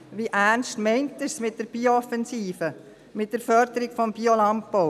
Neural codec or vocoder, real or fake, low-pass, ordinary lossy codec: none; real; 14.4 kHz; none